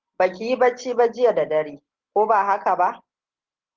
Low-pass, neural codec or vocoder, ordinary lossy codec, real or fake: 7.2 kHz; none; Opus, 16 kbps; real